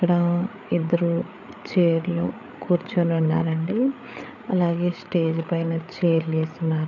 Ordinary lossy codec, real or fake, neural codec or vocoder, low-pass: none; fake; codec, 16 kHz, 8 kbps, FreqCodec, larger model; 7.2 kHz